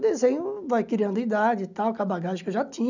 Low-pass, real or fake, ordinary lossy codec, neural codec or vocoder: 7.2 kHz; real; none; none